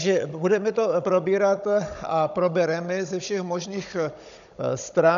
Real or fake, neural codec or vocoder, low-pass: fake; codec, 16 kHz, 16 kbps, FunCodec, trained on Chinese and English, 50 frames a second; 7.2 kHz